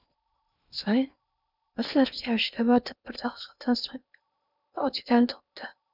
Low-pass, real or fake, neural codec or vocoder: 5.4 kHz; fake; codec, 16 kHz in and 24 kHz out, 0.6 kbps, FocalCodec, streaming, 2048 codes